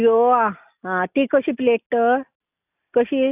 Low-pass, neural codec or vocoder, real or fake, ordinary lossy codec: 3.6 kHz; none; real; none